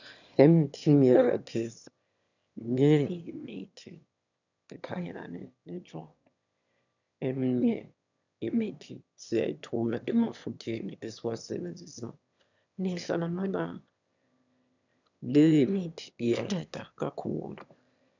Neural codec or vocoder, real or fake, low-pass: autoencoder, 22.05 kHz, a latent of 192 numbers a frame, VITS, trained on one speaker; fake; 7.2 kHz